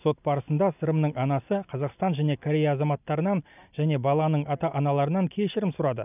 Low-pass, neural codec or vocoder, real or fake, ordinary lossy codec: 3.6 kHz; none; real; none